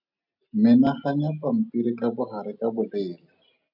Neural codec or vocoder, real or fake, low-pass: none; real; 5.4 kHz